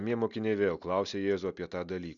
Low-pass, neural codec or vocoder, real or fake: 7.2 kHz; none; real